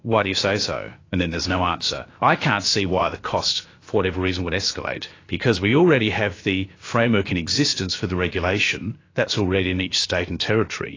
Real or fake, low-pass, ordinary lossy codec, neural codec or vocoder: fake; 7.2 kHz; AAC, 32 kbps; codec, 16 kHz, about 1 kbps, DyCAST, with the encoder's durations